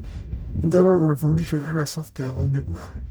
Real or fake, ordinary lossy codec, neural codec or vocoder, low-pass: fake; none; codec, 44.1 kHz, 0.9 kbps, DAC; none